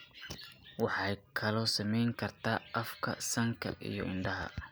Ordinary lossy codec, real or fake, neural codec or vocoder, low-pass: none; real; none; none